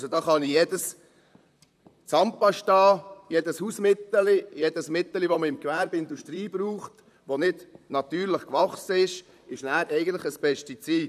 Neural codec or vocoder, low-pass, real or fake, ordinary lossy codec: vocoder, 44.1 kHz, 128 mel bands, Pupu-Vocoder; 14.4 kHz; fake; none